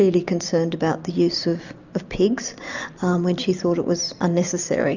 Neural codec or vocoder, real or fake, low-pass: none; real; 7.2 kHz